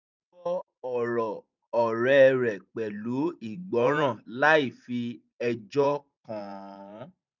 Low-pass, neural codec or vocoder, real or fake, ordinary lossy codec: 7.2 kHz; vocoder, 44.1 kHz, 128 mel bands every 512 samples, BigVGAN v2; fake; none